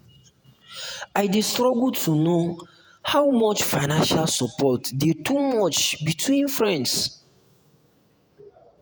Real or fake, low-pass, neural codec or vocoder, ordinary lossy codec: fake; none; vocoder, 48 kHz, 128 mel bands, Vocos; none